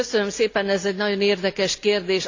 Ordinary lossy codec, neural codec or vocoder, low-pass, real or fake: AAC, 48 kbps; none; 7.2 kHz; real